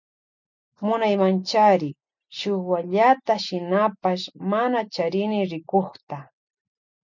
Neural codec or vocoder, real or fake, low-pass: none; real; 7.2 kHz